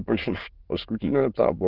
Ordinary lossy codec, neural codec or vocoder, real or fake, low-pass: Opus, 16 kbps; autoencoder, 22.05 kHz, a latent of 192 numbers a frame, VITS, trained on many speakers; fake; 5.4 kHz